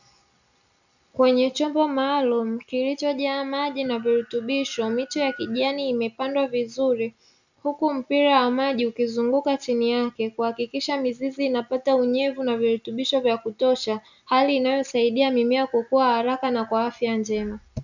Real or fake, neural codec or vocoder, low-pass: real; none; 7.2 kHz